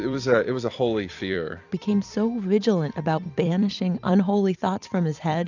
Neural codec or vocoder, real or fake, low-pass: vocoder, 44.1 kHz, 128 mel bands every 256 samples, BigVGAN v2; fake; 7.2 kHz